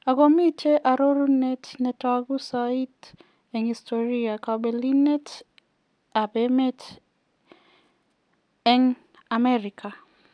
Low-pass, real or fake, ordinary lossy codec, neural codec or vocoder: 9.9 kHz; real; none; none